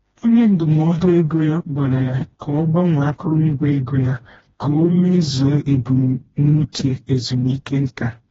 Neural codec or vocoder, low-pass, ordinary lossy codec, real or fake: codec, 16 kHz, 1 kbps, FreqCodec, smaller model; 7.2 kHz; AAC, 24 kbps; fake